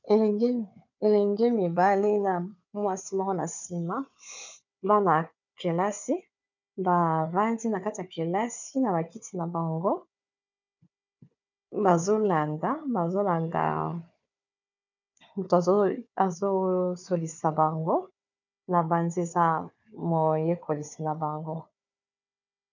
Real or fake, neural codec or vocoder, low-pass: fake; codec, 16 kHz, 4 kbps, FunCodec, trained on Chinese and English, 50 frames a second; 7.2 kHz